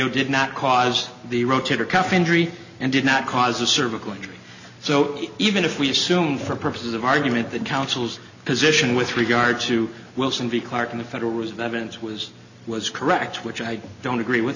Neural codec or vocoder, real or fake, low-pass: none; real; 7.2 kHz